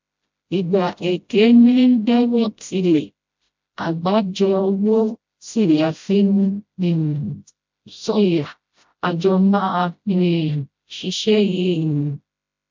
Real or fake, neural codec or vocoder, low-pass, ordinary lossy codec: fake; codec, 16 kHz, 0.5 kbps, FreqCodec, smaller model; 7.2 kHz; MP3, 64 kbps